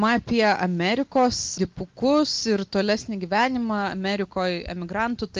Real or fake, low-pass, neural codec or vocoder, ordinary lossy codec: real; 7.2 kHz; none; Opus, 24 kbps